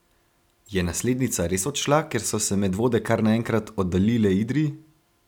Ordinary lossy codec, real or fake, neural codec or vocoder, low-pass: none; real; none; 19.8 kHz